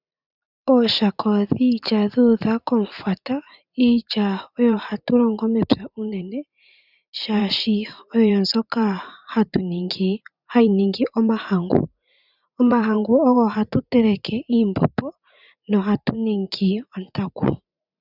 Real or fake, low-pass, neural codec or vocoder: fake; 5.4 kHz; vocoder, 44.1 kHz, 80 mel bands, Vocos